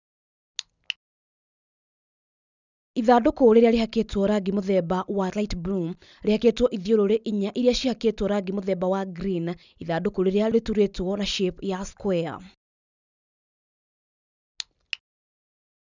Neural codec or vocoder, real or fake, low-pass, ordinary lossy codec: none; real; 7.2 kHz; none